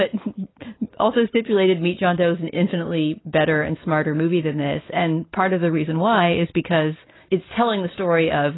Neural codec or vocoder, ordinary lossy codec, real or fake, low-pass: none; AAC, 16 kbps; real; 7.2 kHz